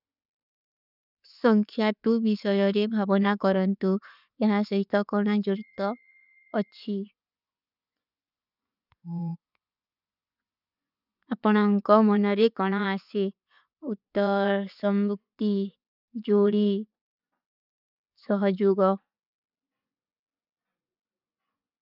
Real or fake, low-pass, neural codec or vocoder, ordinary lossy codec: fake; 5.4 kHz; vocoder, 24 kHz, 100 mel bands, Vocos; none